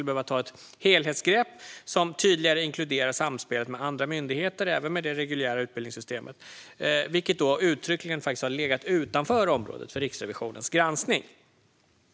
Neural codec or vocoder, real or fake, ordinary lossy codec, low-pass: none; real; none; none